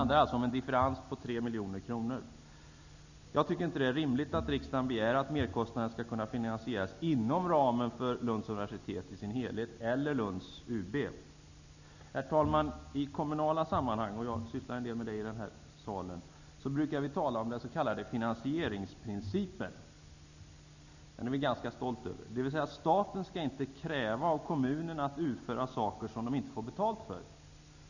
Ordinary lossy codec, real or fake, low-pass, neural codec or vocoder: none; real; 7.2 kHz; none